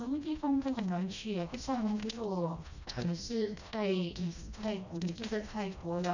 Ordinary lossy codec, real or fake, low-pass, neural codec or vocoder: none; fake; 7.2 kHz; codec, 16 kHz, 1 kbps, FreqCodec, smaller model